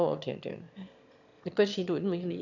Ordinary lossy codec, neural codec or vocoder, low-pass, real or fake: none; autoencoder, 22.05 kHz, a latent of 192 numbers a frame, VITS, trained on one speaker; 7.2 kHz; fake